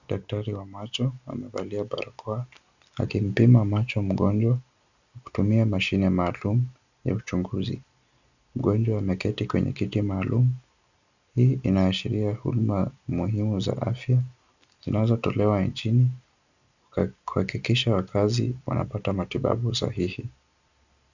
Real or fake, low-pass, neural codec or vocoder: real; 7.2 kHz; none